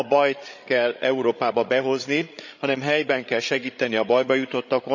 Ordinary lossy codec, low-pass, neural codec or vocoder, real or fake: none; 7.2 kHz; codec, 16 kHz, 16 kbps, FreqCodec, larger model; fake